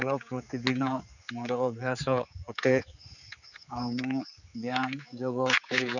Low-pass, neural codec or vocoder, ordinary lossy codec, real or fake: 7.2 kHz; codec, 16 kHz, 4 kbps, X-Codec, HuBERT features, trained on general audio; none; fake